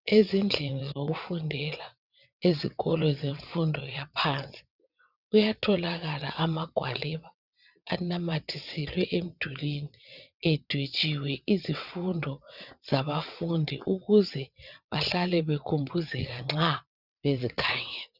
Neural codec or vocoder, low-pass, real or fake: vocoder, 22.05 kHz, 80 mel bands, WaveNeXt; 5.4 kHz; fake